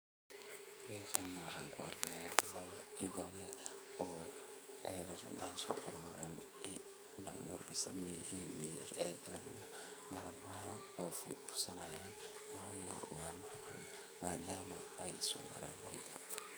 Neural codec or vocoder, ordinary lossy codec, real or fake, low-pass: codec, 44.1 kHz, 2.6 kbps, SNAC; none; fake; none